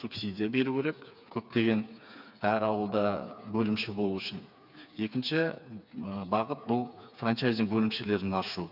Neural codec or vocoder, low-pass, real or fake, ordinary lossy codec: codec, 16 kHz, 4 kbps, FreqCodec, smaller model; 5.4 kHz; fake; none